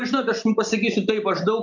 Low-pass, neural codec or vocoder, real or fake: 7.2 kHz; none; real